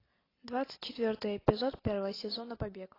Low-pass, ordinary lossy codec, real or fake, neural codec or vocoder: 5.4 kHz; AAC, 24 kbps; real; none